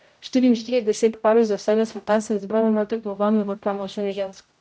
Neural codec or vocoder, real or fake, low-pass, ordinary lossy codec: codec, 16 kHz, 0.5 kbps, X-Codec, HuBERT features, trained on general audio; fake; none; none